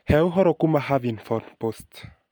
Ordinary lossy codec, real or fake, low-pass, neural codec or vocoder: none; real; none; none